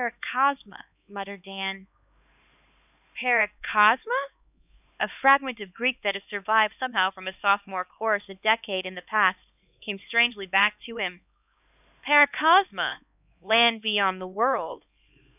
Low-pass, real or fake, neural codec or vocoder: 3.6 kHz; fake; codec, 16 kHz, 2 kbps, X-Codec, HuBERT features, trained on LibriSpeech